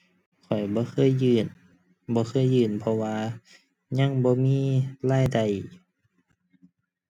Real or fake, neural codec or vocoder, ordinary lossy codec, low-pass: real; none; none; 19.8 kHz